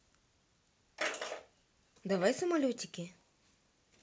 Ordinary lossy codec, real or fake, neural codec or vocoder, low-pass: none; real; none; none